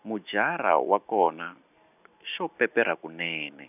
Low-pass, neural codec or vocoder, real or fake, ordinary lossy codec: 3.6 kHz; none; real; none